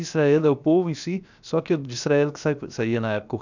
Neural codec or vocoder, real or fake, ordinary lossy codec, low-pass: codec, 16 kHz, 0.7 kbps, FocalCodec; fake; none; 7.2 kHz